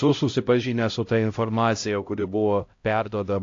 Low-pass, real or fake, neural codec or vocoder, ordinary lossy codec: 7.2 kHz; fake; codec, 16 kHz, 0.5 kbps, X-Codec, HuBERT features, trained on LibriSpeech; AAC, 48 kbps